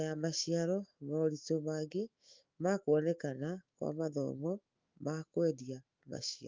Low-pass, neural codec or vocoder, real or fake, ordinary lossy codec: 7.2 kHz; autoencoder, 48 kHz, 128 numbers a frame, DAC-VAE, trained on Japanese speech; fake; Opus, 32 kbps